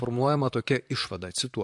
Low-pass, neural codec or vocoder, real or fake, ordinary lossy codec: 10.8 kHz; vocoder, 44.1 kHz, 128 mel bands, Pupu-Vocoder; fake; AAC, 64 kbps